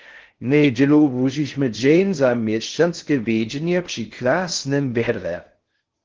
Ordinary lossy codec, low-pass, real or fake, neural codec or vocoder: Opus, 16 kbps; 7.2 kHz; fake; codec, 16 kHz in and 24 kHz out, 0.6 kbps, FocalCodec, streaming, 4096 codes